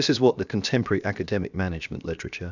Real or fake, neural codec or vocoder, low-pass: fake; codec, 16 kHz, 0.7 kbps, FocalCodec; 7.2 kHz